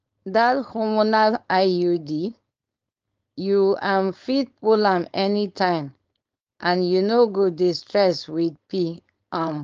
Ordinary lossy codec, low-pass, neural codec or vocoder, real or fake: Opus, 24 kbps; 7.2 kHz; codec, 16 kHz, 4.8 kbps, FACodec; fake